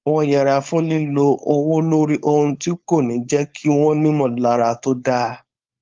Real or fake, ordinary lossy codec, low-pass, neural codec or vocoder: fake; Opus, 32 kbps; 7.2 kHz; codec, 16 kHz, 4.8 kbps, FACodec